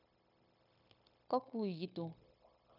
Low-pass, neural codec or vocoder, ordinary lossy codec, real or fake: 5.4 kHz; codec, 16 kHz, 0.9 kbps, LongCat-Audio-Codec; none; fake